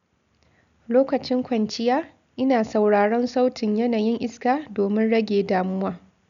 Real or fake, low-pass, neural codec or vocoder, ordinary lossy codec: real; 7.2 kHz; none; none